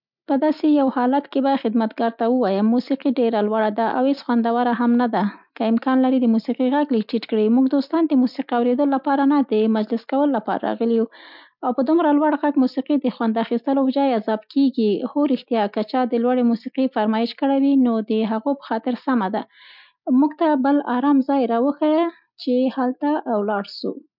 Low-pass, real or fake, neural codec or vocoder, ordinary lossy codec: 5.4 kHz; real; none; none